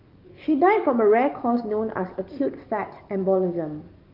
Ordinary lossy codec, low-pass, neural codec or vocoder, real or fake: Opus, 32 kbps; 5.4 kHz; codec, 44.1 kHz, 7.8 kbps, DAC; fake